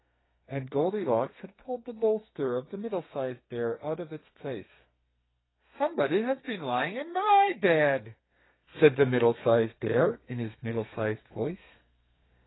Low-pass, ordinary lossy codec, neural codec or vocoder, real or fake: 7.2 kHz; AAC, 16 kbps; codec, 32 kHz, 1.9 kbps, SNAC; fake